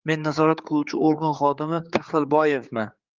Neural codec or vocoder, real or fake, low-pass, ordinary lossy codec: codec, 16 kHz, 4 kbps, X-Codec, HuBERT features, trained on general audio; fake; 7.2 kHz; Opus, 24 kbps